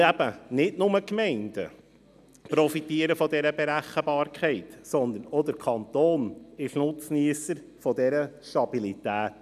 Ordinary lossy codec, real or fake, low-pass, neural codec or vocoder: none; real; 14.4 kHz; none